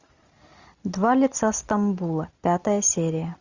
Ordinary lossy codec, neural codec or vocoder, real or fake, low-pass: Opus, 64 kbps; none; real; 7.2 kHz